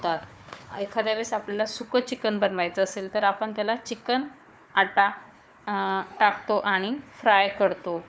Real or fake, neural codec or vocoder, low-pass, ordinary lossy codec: fake; codec, 16 kHz, 4 kbps, FunCodec, trained on Chinese and English, 50 frames a second; none; none